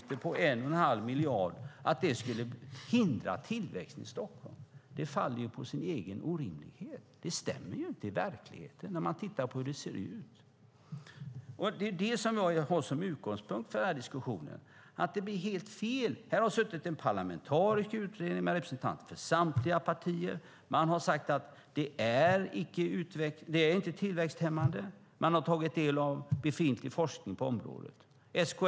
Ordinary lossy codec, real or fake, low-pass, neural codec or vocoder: none; real; none; none